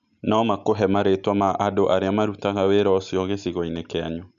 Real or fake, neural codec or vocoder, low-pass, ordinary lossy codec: real; none; 7.2 kHz; none